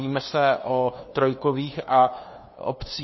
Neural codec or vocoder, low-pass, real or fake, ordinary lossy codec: codec, 16 kHz, 2 kbps, FunCodec, trained on LibriTTS, 25 frames a second; 7.2 kHz; fake; MP3, 24 kbps